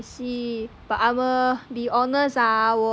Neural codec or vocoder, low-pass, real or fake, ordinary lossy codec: none; none; real; none